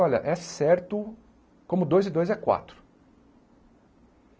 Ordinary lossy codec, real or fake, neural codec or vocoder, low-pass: none; real; none; none